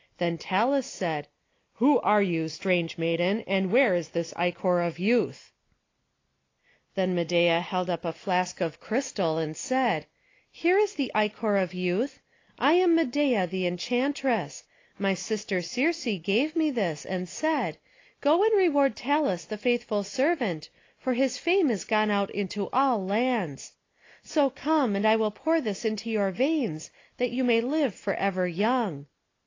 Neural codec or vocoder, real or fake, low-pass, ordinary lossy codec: none; real; 7.2 kHz; AAC, 32 kbps